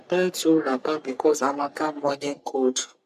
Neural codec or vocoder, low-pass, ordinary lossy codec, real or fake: codec, 44.1 kHz, 3.4 kbps, Pupu-Codec; 14.4 kHz; none; fake